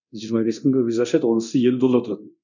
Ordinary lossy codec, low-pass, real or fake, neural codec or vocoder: none; 7.2 kHz; fake; codec, 24 kHz, 0.9 kbps, DualCodec